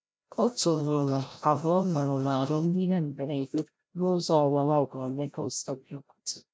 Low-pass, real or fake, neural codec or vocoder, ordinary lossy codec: none; fake; codec, 16 kHz, 0.5 kbps, FreqCodec, larger model; none